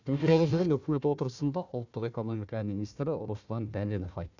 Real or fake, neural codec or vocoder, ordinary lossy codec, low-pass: fake; codec, 16 kHz, 1 kbps, FunCodec, trained on Chinese and English, 50 frames a second; none; 7.2 kHz